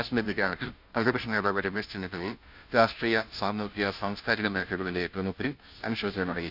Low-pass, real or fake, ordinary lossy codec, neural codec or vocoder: 5.4 kHz; fake; none; codec, 16 kHz, 0.5 kbps, FunCodec, trained on Chinese and English, 25 frames a second